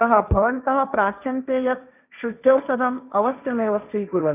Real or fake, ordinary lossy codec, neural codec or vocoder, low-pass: fake; none; codec, 16 kHz, 1.1 kbps, Voila-Tokenizer; 3.6 kHz